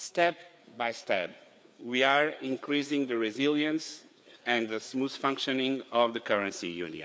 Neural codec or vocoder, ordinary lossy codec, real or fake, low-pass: codec, 16 kHz, 4 kbps, FreqCodec, larger model; none; fake; none